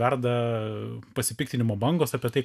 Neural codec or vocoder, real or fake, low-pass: none; real; 14.4 kHz